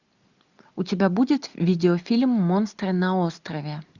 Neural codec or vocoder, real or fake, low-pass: none; real; 7.2 kHz